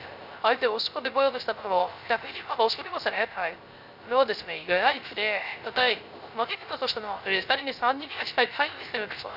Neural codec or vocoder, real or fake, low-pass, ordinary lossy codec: codec, 16 kHz, 0.3 kbps, FocalCodec; fake; 5.4 kHz; none